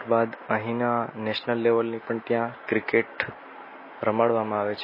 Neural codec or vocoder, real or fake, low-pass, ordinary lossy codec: none; real; 5.4 kHz; MP3, 24 kbps